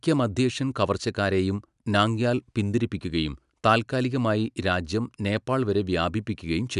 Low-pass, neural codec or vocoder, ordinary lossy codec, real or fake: 10.8 kHz; none; none; real